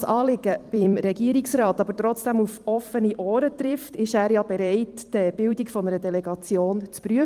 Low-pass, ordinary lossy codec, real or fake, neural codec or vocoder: 14.4 kHz; Opus, 24 kbps; fake; vocoder, 44.1 kHz, 128 mel bands every 256 samples, BigVGAN v2